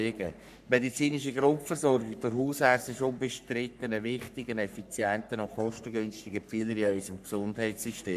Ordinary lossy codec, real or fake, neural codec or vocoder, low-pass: none; fake; codec, 44.1 kHz, 3.4 kbps, Pupu-Codec; 14.4 kHz